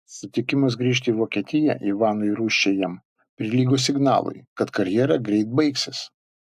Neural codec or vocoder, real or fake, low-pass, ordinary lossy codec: none; real; 14.4 kHz; AAC, 96 kbps